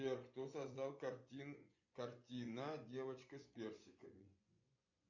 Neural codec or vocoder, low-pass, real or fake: vocoder, 24 kHz, 100 mel bands, Vocos; 7.2 kHz; fake